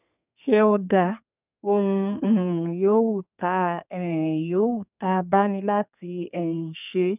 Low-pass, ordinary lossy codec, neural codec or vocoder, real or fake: 3.6 kHz; none; codec, 32 kHz, 1.9 kbps, SNAC; fake